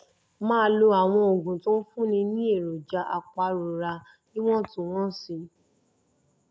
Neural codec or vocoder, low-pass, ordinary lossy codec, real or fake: none; none; none; real